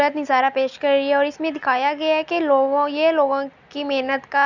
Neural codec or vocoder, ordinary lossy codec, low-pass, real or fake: none; none; 7.2 kHz; real